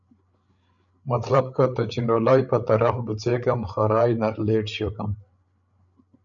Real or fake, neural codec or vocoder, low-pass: fake; codec, 16 kHz, 8 kbps, FreqCodec, larger model; 7.2 kHz